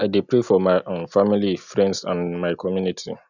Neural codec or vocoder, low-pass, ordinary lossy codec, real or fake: none; 7.2 kHz; none; real